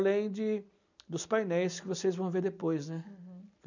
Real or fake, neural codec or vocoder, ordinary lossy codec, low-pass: real; none; none; 7.2 kHz